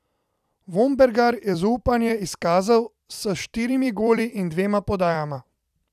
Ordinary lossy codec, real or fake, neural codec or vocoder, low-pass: none; fake; vocoder, 44.1 kHz, 128 mel bands every 256 samples, BigVGAN v2; 14.4 kHz